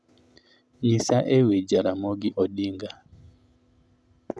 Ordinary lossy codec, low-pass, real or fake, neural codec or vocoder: none; none; real; none